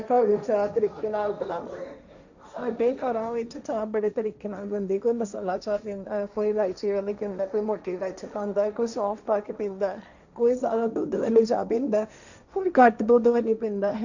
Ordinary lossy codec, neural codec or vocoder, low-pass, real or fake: none; codec, 16 kHz, 1.1 kbps, Voila-Tokenizer; 7.2 kHz; fake